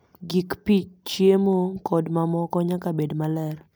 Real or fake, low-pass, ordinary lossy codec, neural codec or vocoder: real; none; none; none